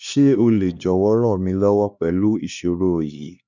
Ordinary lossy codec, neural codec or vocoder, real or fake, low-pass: none; codec, 16 kHz, 2 kbps, X-Codec, HuBERT features, trained on LibriSpeech; fake; 7.2 kHz